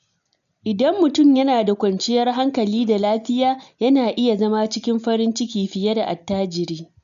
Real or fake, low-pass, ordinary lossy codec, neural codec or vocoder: real; 7.2 kHz; none; none